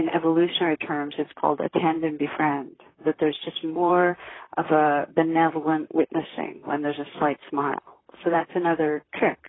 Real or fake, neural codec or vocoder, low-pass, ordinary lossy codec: fake; vocoder, 44.1 kHz, 128 mel bands, Pupu-Vocoder; 7.2 kHz; AAC, 16 kbps